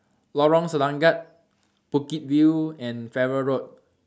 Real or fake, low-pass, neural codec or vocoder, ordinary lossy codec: real; none; none; none